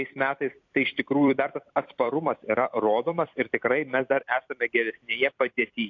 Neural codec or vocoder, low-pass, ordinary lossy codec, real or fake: vocoder, 44.1 kHz, 128 mel bands every 256 samples, BigVGAN v2; 7.2 kHz; AAC, 48 kbps; fake